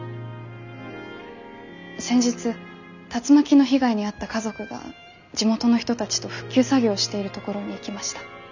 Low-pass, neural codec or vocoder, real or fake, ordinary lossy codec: 7.2 kHz; none; real; none